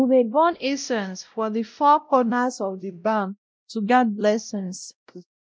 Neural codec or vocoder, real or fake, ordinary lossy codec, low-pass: codec, 16 kHz, 0.5 kbps, X-Codec, WavLM features, trained on Multilingual LibriSpeech; fake; none; none